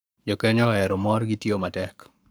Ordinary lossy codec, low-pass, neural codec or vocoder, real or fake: none; none; codec, 44.1 kHz, 7.8 kbps, Pupu-Codec; fake